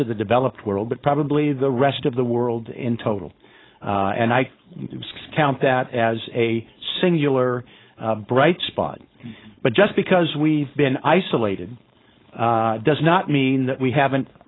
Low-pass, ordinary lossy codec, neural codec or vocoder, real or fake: 7.2 kHz; AAC, 16 kbps; codec, 16 kHz, 4.8 kbps, FACodec; fake